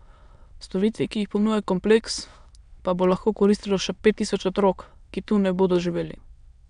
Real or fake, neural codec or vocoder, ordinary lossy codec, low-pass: fake; autoencoder, 22.05 kHz, a latent of 192 numbers a frame, VITS, trained on many speakers; none; 9.9 kHz